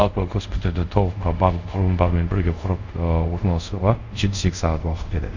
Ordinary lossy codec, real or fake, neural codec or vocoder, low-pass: none; fake; codec, 24 kHz, 0.5 kbps, DualCodec; 7.2 kHz